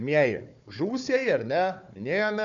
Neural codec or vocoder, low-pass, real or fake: codec, 16 kHz, 4 kbps, FunCodec, trained on Chinese and English, 50 frames a second; 7.2 kHz; fake